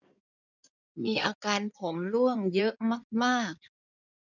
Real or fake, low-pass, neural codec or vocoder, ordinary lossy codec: fake; 7.2 kHz; codec, 16 kHz in and 24 kHz out, 2.2 kbps, FireRedTTS-2 codec; none